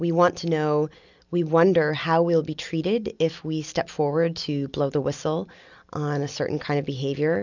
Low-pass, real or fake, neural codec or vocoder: 7.2 kHz; real; none